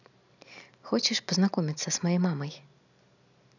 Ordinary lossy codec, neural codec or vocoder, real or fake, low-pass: none; none; real; 7.2 kHz